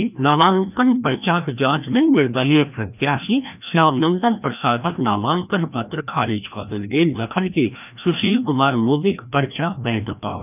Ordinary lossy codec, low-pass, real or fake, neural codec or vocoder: none; 3.6 kHz; fake; codec, 16 kHz, 1 kbps, FreqCodec, larger model